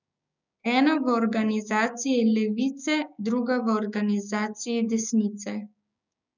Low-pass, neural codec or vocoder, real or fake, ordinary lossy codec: 7.2 kHz; codec, 16 kHz, 6 kbps, DAC; fake; none